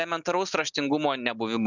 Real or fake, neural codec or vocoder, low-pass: real; none; 7.2 kHz